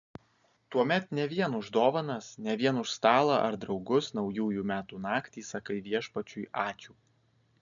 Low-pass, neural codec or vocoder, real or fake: 7.2 kHz; none; real